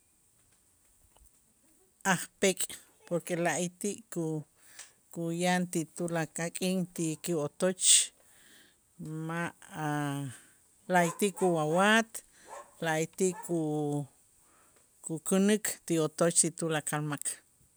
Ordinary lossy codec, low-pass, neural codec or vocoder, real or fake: none; none; none; real